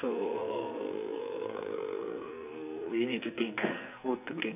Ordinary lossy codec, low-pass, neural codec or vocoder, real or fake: none; 3.6 kHz; codec, 44.1 kHz, 2.6 kbps, SNAC; fake